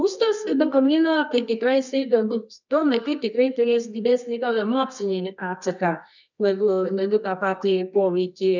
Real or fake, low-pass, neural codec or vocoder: fake; 7.2 kHz; codec, 24 kHz, 0.9 kbps, WavTokenizer, medium music audio release